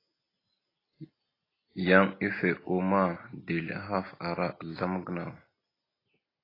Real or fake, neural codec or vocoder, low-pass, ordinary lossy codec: real; none; 5.4 kHz; AAC, 24 kbps